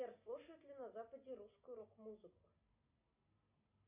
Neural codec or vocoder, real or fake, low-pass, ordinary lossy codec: none; real; 3.6 kHz; MP3, 24 kbps